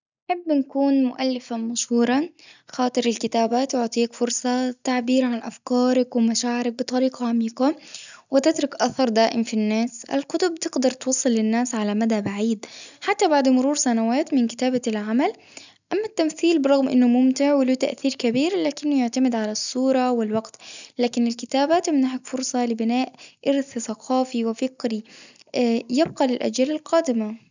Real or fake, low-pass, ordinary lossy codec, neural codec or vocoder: real; 7.2 kHz; none; none